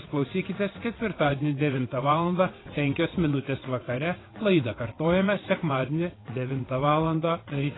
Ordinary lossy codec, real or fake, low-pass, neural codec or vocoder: AAC, 16 kbps; fake; 7.2 kHz; vocoder, 22.05 kHz, 80 mel bands, WaveNeXt